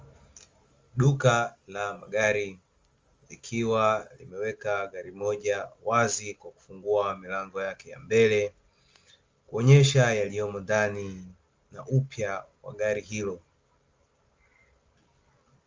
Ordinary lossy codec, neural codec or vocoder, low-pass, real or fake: Opus, 32 kbps; none; 7.2 kHz; real